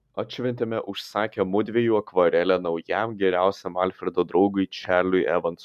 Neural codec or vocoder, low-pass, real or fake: none; 14.4 kHz; real